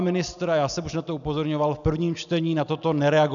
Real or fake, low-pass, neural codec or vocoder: real; 7.2 kHz; none